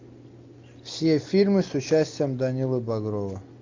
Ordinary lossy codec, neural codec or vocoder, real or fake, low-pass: MP3, 64 kbps; none; real; 7.2 kHz